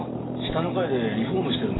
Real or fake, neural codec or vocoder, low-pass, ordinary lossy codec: real; none; 7.2 kHz; AAC, 16 kbps